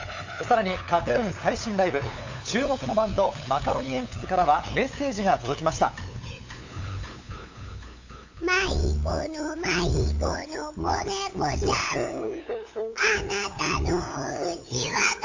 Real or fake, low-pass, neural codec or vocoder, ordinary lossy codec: fake; 7.2 kHz; codec, 16 kHz, 8 kbps, FunCodec, trained on LibriTTS, 25 frames a second; AAC, 48 kbps